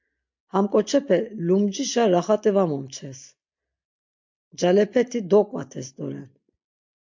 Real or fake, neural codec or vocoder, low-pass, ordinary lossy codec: real; none; 7.2 kHz; MP3, 48 kbps